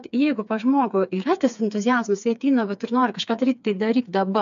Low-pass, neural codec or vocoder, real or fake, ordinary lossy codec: 7.2 kHz; codec, 16 kHz, 4 kbps, FreqCodec, smaller model; fake; AAC, 96 kbps